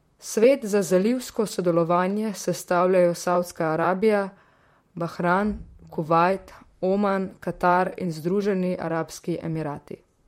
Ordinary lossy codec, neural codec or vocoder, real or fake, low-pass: MP3, 64 kbps; vocoder, 44.1 kHz, 128 mel bands, Pupu-Vocoder; fake; 19.8 kHz